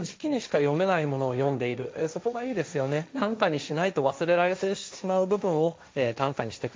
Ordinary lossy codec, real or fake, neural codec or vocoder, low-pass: none; fake; codec, 16 kHz, 1.1 kbps, Voila-Tokenizer; none